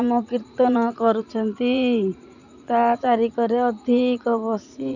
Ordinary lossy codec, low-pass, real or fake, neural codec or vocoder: none; 7.2 kHz; fake; vocoder, 44.1 kHz, 80 mel bands, Vocos